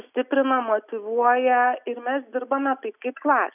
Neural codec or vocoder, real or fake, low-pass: none; real; 3.6 kHz